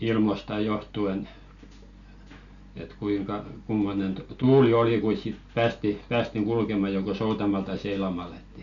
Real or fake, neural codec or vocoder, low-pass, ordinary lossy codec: real; none; 7.2 kHz; none